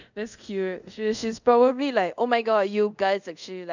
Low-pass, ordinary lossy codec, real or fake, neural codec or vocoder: 7.2 kHz; none; fake; codec, 24 kHz, 0.5 kbps, DualCodec